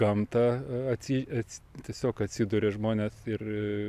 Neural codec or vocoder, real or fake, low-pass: none; real; 14.4 kHz